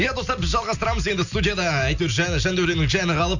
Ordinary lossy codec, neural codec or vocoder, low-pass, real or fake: none; none; 7.2 kHz; real